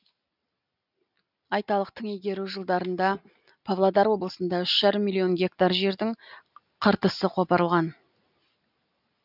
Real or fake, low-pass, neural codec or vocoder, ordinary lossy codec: real; 5.4 kHz; none; none